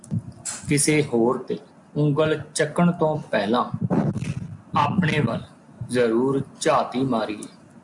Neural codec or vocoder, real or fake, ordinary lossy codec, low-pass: none; real; MP3, 64 kbps; 10.8 kHz